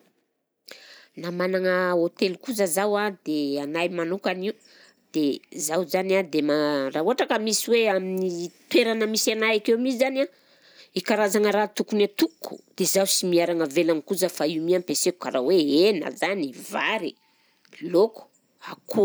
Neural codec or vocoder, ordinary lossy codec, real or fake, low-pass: none; none; real; none